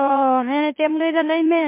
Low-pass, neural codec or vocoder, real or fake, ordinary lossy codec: 3.6 kHz; autoencoder, 44.1 kHz, a latent of 192 numbers a frame, MeloTTS; fake; MP3, 24 kbps